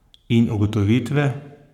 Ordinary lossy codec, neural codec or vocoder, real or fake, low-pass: none; codec, 44.1 kHz, 7.8 kbps, Pupu-Codec; fake; 19.8 kHz